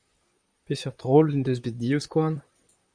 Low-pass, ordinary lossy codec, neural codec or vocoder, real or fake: 9.9 kHz; Opus, 64 kbps; vocoder, 44.1 kHz, 128 mel bands, Pupu-Vocoder; fake